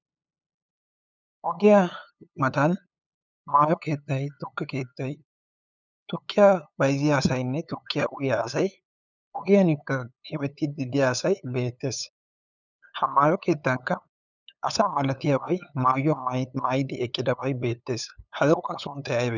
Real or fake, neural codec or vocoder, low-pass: fake; codec, 16 kHz, 8 kbps, FunCodec, trained on LibriTTS, 25 frames a second; 7.2 kHz